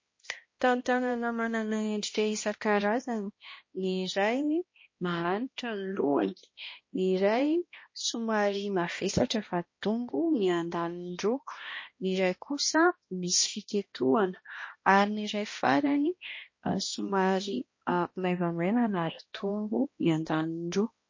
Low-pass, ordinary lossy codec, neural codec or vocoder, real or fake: 7.2 kHz; MP3, 32 kbps; codec, 16 kHz, 1 kbps, X-Codec, HuBERT features, trained on balanced general audio; fake